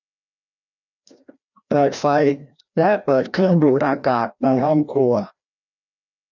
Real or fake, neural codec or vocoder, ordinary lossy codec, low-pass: fake; codec, 16 kHz, 1 kbps, FreqCodec, larger model; none; 7.2 kHz